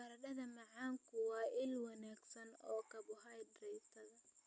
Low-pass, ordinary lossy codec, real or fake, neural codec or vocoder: none; none; real; none